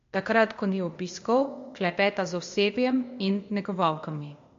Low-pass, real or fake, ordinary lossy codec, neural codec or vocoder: 7.2 kHz; fake; MP3, 48 kbps; codec, 16 kHz, 0.8 kbps, ZipCodec